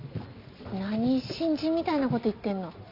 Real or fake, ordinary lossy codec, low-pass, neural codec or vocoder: real; MP3, 48 kbps; 5.4 kHz; none